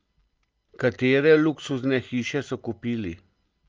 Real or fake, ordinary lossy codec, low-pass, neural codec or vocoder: real; Opus, 24 kbps; 7.2 kHz; none